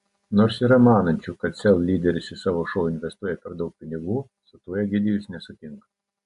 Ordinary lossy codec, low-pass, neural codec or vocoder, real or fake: AAC, 64 kbps; 10.8 kHz; none; real